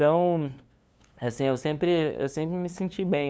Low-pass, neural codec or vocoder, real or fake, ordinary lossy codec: none; codec, 16 kHz, 2 kbps, FunCodec, trained on LibriTTS, 25 frames a second; fake; none